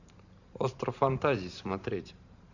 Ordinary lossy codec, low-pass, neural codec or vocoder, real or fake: none; 7.2 kHz; vocoder, 44.1 kHz, 128 mel bands every 512 samples, BigVGAN v2; fake